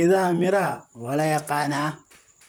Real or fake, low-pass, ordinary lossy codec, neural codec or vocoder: fake; none; none; vocoder, 44.1 kHz, 128 mel bands, Pupu-Vocoder